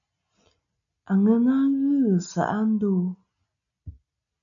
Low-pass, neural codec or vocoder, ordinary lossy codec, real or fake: 7.2 kHz; none; MP3, 64 kbps; real